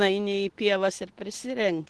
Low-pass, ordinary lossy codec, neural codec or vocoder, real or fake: 10.8 kHz; Opus, 16 kbps; none; real